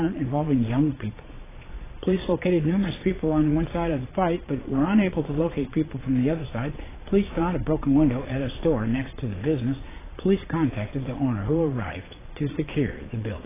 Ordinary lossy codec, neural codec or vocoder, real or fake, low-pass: AAC, 16 kbps; vocoder, 22.05 kHz, 80 mel bands, Vocos; fake; 3.6 kHz